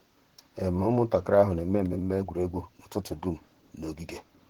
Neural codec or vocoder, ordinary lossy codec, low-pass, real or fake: vocoder, 44.1 kHz, 128 mel bands, Pupu-Vocoder; Opus, 16 kbps; 19.8 kHz; fake